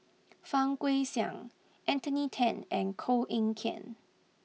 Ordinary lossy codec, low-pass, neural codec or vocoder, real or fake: none; none; none; real